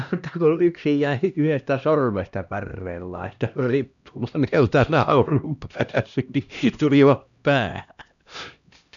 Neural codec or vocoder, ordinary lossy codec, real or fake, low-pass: codec, 16 kHz, 1 kbps, X-Codec, HuBERT features, trained on LibriSpeech; none; fake; 7.2 kHz